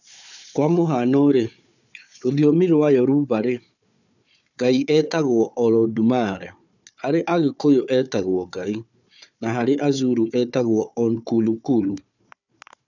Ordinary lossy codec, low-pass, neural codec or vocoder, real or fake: none; 7.2 kHz; codec, 16 kHz, 4 kbps, FunCodec, trained on Chinese and English, 50 frames a second; fake